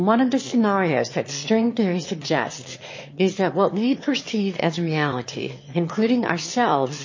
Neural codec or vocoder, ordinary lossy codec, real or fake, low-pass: autoencoder, 22.05 kHz, a latent of 192 numbers a frame, VITS, trained on one speaker; MP3, 32 kbps; fake; 7.2 kHz